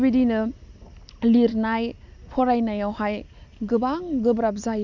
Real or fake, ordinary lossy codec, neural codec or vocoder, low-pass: real; none; none; 7.2 kHz